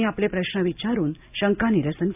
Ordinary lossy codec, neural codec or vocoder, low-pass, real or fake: AAC, 32 kbps; none; 3.6 kHz; real